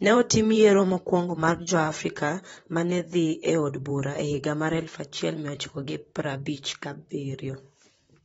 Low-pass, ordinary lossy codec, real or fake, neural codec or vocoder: 19.8 kHz; AAC, 24 kbps; fake; vocoder, 44.1 kHz, 128 mel bands, Pupu-Vocoder